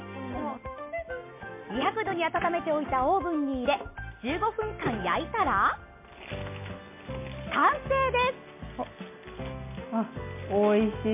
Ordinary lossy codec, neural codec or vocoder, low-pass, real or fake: MP3, 24 kbps; none; 3.6 kHz; real